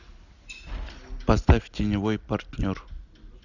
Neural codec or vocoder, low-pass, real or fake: none; 7.2 kHz; real